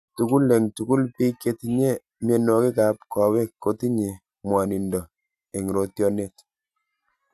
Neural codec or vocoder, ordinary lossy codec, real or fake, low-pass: none; none; real; 14.4 kHz